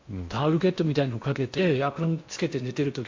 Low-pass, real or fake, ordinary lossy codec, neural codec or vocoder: 7.2 kHz; fake; MP3, 48 kbps; codec, 16 kHz in and 24 kHz out, 0.8 kbps, FocalCodec, streaming, 65536 codes